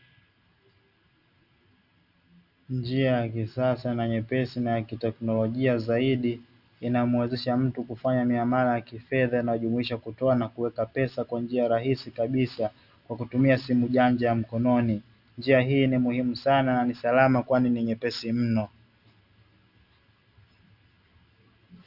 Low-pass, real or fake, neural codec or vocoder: 5.4 kHz; real; none